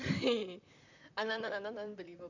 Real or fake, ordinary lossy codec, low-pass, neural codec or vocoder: fake; none; 7.2 kHz; vocoder, 44.1 kHz, 128 mel bands, Pupu-Vocoder